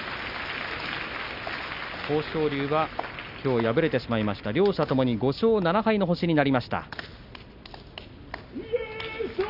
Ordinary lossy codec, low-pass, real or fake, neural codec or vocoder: Opus, 64 kbps; 5.4 kHz; real; none